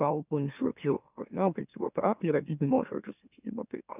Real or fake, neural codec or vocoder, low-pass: fake; autoencoder, 44.1 kHz, a latent of 192 numbers a frame, MeloTTS; 3.6 kHz